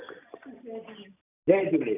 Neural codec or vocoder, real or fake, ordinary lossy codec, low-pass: none; real; none; 3.6 kHz